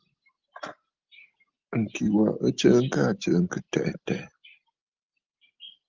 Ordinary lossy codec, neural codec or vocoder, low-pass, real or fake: Opus, 32 kbps; none; 7.2 kHz; real